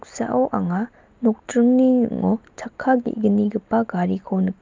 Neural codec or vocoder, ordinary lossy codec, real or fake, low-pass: none; Opus, 32 kbps; real; 7.2 kHz